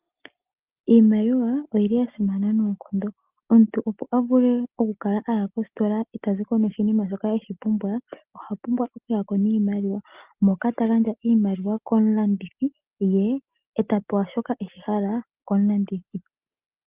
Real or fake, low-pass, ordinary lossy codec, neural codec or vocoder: real; 3.6 kHz; Opus, 32 kbps; none